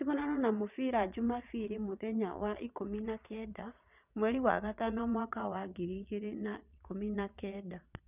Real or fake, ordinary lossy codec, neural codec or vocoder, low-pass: fake; none; vocoder, 22.05 kHz, 80 mel bands, Vocos; 3.6 kHz